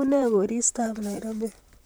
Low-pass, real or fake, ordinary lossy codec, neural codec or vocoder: none; fake; none; vocoder, 44.1 kHz, 128 mel bands, Pupu-Vocoder